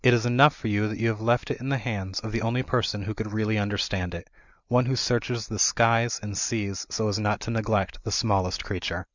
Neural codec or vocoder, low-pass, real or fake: none; 7.2 kHz; real